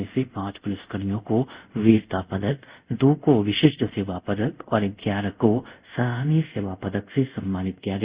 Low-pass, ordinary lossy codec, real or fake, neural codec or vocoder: 3.6 kHz; Opus, 64 kbps; fake; codec, 24 kHz, 0.5 kbps, DualCodec